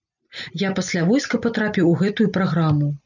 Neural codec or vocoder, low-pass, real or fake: none; 7.2 kHz; real